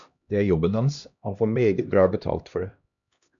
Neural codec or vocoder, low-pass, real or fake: codec, 16 kHz, 1 kbps, X-Codec, HuBERT features, trained on LibriSpeech; 7.2 kHz; fake